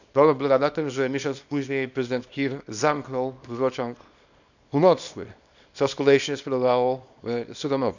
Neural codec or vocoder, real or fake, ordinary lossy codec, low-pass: codec, 24 kHz, 0.9 kbps, WavTokenizer, small release; fake; none; 7.2 kHz